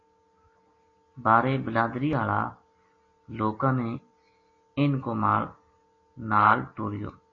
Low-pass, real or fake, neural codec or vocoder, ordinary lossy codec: 7.2 kHz; real; none; AAC, 32 kbps